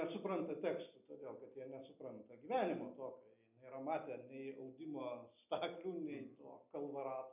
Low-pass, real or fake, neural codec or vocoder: 3.6 kHz; real; none